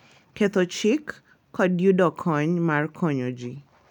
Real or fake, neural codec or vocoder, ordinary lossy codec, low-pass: real; none; none; 19.8 kHz